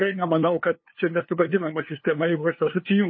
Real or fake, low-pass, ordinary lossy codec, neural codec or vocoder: fake; 7.2 kHz; MP3, 24 kbps; codec, 16 kHz, 2 kbps, FunCodec, trained on LibriTTS, 25 frames a second